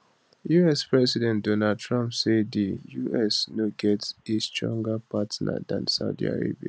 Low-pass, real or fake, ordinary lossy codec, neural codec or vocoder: none; real; none; none